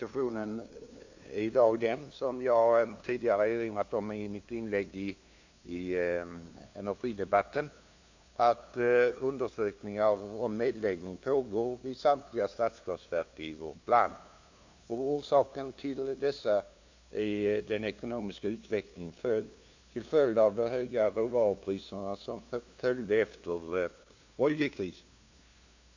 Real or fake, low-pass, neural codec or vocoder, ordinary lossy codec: fake; 7.2 kHz; codec, 16 kHz, 2 kbps, FunCodec, trained on LibriTTS, 25 frames a second; AAC, 48 kbps